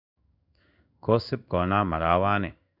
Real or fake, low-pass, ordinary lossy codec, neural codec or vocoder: fake; 5.4 kHz; none; codec, 16 kHz in and 24 kHz out, 1 kbps, XY-Tokenizer